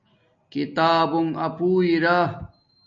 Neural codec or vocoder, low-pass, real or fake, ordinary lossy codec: none; 7.2 kHz; real; MP3, 48 kbps